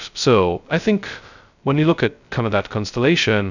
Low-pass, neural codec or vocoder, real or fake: 7.2 kHz; codec, 16 kHz, 0.2 kbps, FocalCodec; fake